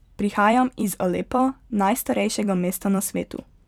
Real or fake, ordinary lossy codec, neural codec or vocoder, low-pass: fake; none; vocoder, 44.1 kHz, 128 mel bands every 256 samples, BigVGAN v2; 19.8 kHz